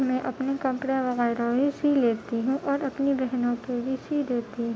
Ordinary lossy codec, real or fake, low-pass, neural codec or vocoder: none; real; none; none